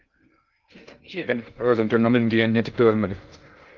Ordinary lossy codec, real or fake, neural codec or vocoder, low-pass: Opus, 24 kbps; fake; codec, 16 kHz in and 24 kHz out, 0.6 kbps, FocalCodec, streaming, 2048 codes; 7.2 kHz